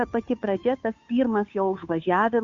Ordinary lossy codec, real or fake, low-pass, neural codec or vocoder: Opus, 64 kbps; fake; 7.2 kHz; codec, 16 kHz, 2 kbps, FunCodec, trained on Chinese and English, 25 frames a second